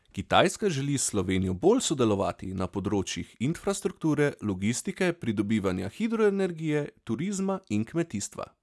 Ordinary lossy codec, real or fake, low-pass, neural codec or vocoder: none; real; none; none